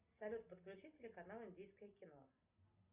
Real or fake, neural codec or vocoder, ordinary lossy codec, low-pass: real; none; Opus, 64 kbps; 3.6 kHz